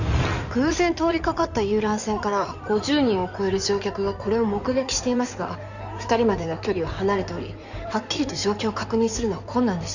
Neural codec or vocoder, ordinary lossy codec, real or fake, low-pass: codec, 16 kHz in and 24 kHz out, 2.2 kbps, FireRedTTS-2 codec; none; fake; 7.2 kHz